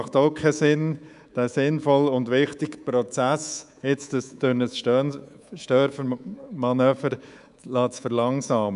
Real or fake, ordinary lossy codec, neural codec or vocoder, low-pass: fake; none; codec, 24 kHz, 3.1 kbps, DualCodec; 10.8 kHz